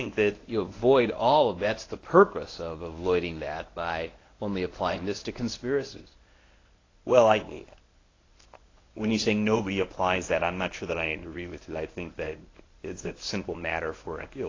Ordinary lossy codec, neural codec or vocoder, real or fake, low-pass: AAC, 32 kbps; codec, 24 kHz, 0.9 kbps, WavTokenizer, medium speech release version 1; fake; 7.2 kHz